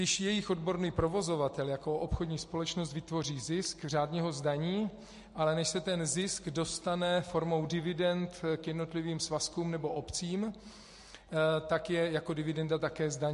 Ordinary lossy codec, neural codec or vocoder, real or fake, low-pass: MP3, 48 kbps; none; real; 14.4 kHz